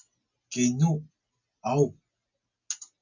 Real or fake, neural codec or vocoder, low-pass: real; none; 7.2 kHz